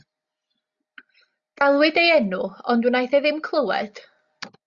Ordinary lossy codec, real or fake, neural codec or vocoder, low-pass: Opus, 64 kbps; real; none; 7.2 kHz